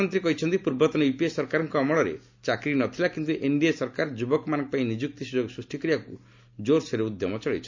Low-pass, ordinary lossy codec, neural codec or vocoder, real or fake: 7.2 kHz; MP3, 64 kbps; none; real